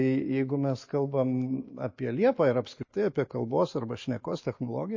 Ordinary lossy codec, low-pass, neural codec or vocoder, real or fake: MP3, 32 kbps; 7.2 kHz; none; real